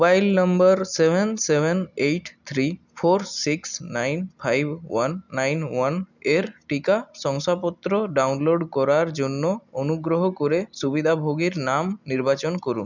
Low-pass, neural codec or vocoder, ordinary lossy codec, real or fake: 7.2 kHz; none; none; real